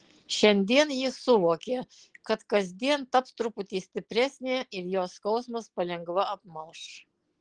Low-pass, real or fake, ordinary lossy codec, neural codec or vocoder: 9.9 kHz; real; Opus, 16 kbps; none